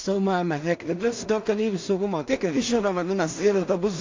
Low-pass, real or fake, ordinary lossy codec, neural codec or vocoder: 7.2 kHz; fake; MP3, 48 kbps; codec, 16 kHz in and 24 kHz out, 0.4 kbps, LongCat-Audio-Codec, two codebook decoder